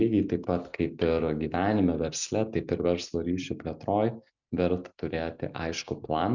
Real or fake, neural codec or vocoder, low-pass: real; none; 7.2 kHz